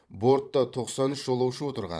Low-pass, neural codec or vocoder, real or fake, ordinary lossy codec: none; vocoder, 22.05 kHz, 80 mel bands, Vocos; fake; none